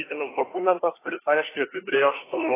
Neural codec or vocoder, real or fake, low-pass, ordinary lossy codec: codec, 24 kHz, 1 kbps, SNAC; fake; 3.6 kHz; AAC, 16 kbps